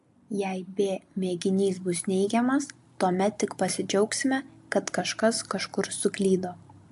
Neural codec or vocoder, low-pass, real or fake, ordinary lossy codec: none; 10.8 kHz; real; AAC, 64 kbps